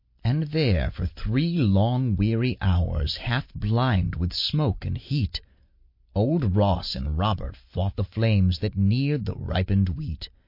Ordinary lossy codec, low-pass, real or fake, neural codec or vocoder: MP3, 32 kbps; 5.4 kHz; real; none